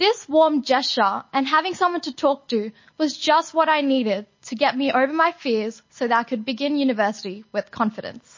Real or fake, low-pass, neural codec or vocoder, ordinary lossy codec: real; 7.2 kHz; none; MP3, 32 kbps